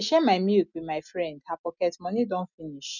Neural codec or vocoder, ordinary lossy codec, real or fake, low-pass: none; none; real; 7.2 kHz